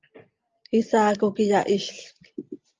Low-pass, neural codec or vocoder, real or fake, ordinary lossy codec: 7.2 kHz; none; real; Opus, 24 kbps